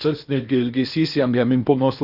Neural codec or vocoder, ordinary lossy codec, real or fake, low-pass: codec, 16 kHz in and 24 kHz out, 0.8 kbps, FocalCodec, streaming, 65536 codes; Opus, 64 kbps; fake; 5.4 kHz